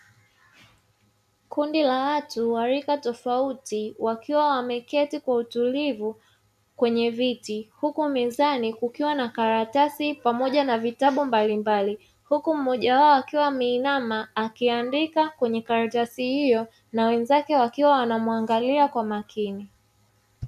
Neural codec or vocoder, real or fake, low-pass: none; real; 14.4 kHz